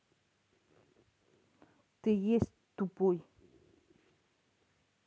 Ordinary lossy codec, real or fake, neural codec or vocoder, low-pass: none; real; none; none